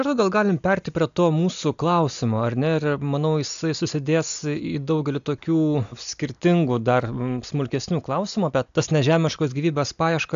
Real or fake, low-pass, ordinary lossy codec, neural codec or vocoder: real; 7.2 kHz; AAC, 96 kbps; none